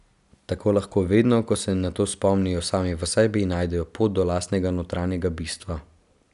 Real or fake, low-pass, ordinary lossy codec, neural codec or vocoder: real; 10.8 kHz; none; none